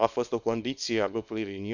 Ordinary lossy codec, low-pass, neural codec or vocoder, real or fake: none; 7.2 kHz; codec, 24 kHz, 0.9 kbps, WavTokenizer, small release; fake